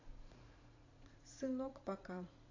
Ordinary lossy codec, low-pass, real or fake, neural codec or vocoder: AAC, 32 kbps; 7.2 kHz; fake; vocoder, 44.1 kHz, 80 mel bands, Vocos